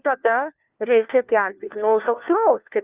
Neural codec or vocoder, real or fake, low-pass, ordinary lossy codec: codec, 16 kHz, 1 kbps, FunCodec, trained on LibriTTS, 50 frames a second; fake; 3.6 kHz; Opus, 32 kbps